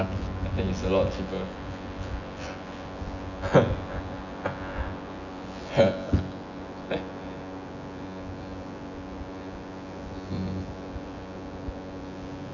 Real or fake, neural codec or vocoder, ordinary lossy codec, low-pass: fake; vocoder, 24 kHz, 100 mel bands, Vocos; none; 7.2 kHz